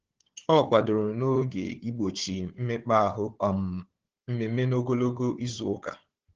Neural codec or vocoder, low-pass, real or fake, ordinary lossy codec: codec, 16 kHz, 4 kbps, FunCodec, trained on Chinese and English, 50 frames a second; 7.2 kHz; fake; Opus, 16 kbps